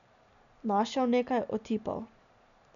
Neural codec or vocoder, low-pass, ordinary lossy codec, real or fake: none; 7.2 kHz; none; real